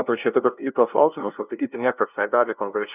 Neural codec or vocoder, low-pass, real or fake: codec, 16 kHz, 0.5 kbps, FunCodec, trained on LibriTTS, 25 frames a second; 3.6 kHz; fake